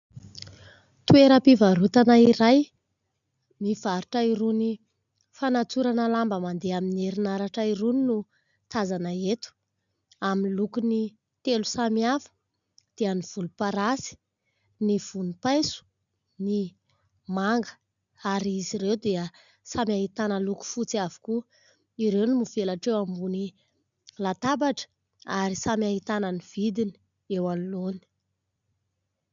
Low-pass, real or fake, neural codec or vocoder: 7.2 kHz; real; none